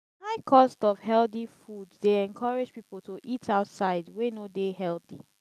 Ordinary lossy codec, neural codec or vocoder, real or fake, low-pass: none; none; real; 14.4 kHz